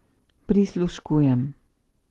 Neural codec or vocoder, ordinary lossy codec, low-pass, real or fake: none; Opus, 16 kbps; 19.8 kHz; real